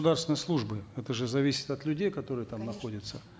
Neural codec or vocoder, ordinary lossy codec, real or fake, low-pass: none; none; real; none